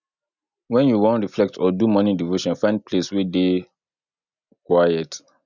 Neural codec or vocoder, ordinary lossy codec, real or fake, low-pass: none; none; real; 7.2 kHz